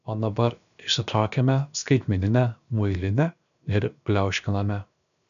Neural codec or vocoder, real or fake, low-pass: codec, 16 kHz, 0.7 kbps, FocalCodec; fake; 7.2 kHz